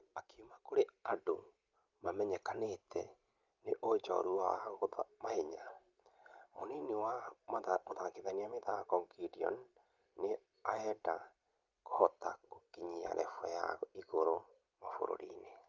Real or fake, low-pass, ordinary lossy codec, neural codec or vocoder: real; 7.2 kHz; Opus, 32 kbps; none